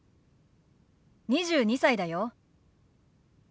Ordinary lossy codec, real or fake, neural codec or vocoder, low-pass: none; real; none; none